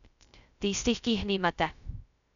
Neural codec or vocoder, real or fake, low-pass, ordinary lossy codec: codec, 16 kHz, 0.2 kbps, FocalCodec; fake; 7.2 kHz; none